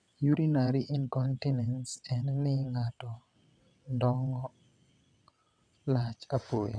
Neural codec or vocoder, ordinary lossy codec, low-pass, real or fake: vocoder, 22.05 kHz, 80 mel bands, WaveNeXt; none; 9.9 kHz; fake